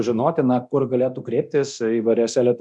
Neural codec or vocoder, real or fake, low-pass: codec, 24 kHz, 0.9 kbps, DualCodec; fake; 10.8 kHz